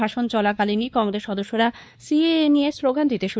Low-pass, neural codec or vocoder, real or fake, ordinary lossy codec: none; codec, 16 kHz, 2 kbps, X-Codec, WavLM features, trained on Multilingual LibriSpeech; fake; none